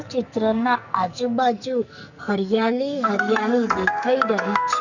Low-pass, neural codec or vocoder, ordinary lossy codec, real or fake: 7.2 kHz; codec, 44.1 kHz, 2.6 kbps, SNAC; none; fake